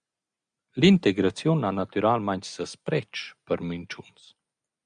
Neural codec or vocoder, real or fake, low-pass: none; real; 9.9 kHz